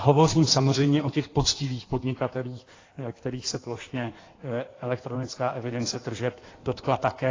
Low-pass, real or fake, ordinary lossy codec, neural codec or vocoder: 7.2 kHz; fake; AAC, 32 kbps; codec, 16 kHz in and 24 kHz out, 1.1 kbps, FireRedTTS-2 codec